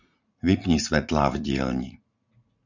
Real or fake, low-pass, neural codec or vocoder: fake; 7.2 kHz; vocoder, 44.1 kHz, 80 mel bands, Vocos